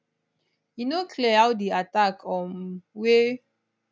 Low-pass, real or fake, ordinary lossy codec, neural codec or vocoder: none; real; none; none